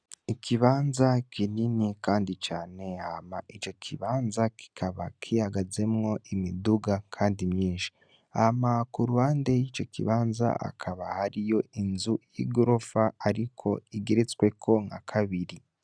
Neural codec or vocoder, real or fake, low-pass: none; real; 9.9 kHz